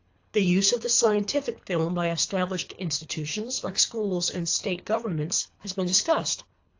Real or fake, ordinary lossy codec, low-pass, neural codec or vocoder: fake; AAC, 48 kbps; 7.2 kHz; codec, 24 kHz, 3 kbps, HILCodec